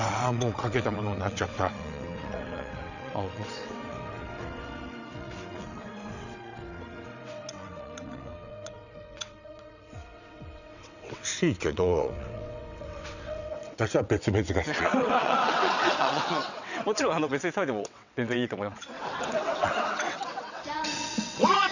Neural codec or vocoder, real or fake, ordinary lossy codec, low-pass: vocoder, 22.05 kHz, 80 mel bands, WaveNeXt; fake; none; 7.2 kHz